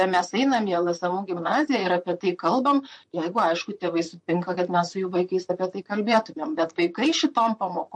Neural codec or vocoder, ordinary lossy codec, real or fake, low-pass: vocoder, 44.1 kHz, 128 mel bands, Pupu-Vocoder; MP3, 48 kbps; fake; 10.8 kHz